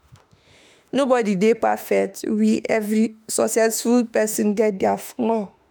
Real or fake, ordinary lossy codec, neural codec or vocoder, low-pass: fake; none; autoencoder, 48 kHz, 32 numbers a frame, DAC-VAE, trained on Japanese speech; none